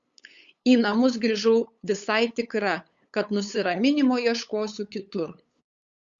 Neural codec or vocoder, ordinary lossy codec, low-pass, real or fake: codec, 16 kHz, 8 kbps, FunCodec, trained on LibriTTS, 25 frames a second; Opus, 64 kbps; 7.2 kHz; fake